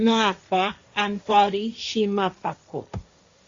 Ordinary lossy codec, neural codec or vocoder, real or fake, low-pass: Opus, 64 kbps; codec, 16 kHz, 1.1 kbps, Voila-Tokenizer; fake; 7.2 kHz